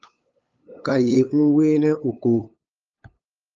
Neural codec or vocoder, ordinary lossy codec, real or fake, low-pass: codec, 16 kHz, 8 kbps, FunCodec, trained on LibriTTS, 25 frames a second; Opus, 24 kbps; fake; 7.2 kHz